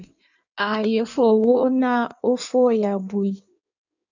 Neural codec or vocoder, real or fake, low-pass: codec, 16 kHz in and 24 kHz out, 1.1 kbps, FireRedTTS-2 codec; fake; 7.2 kHz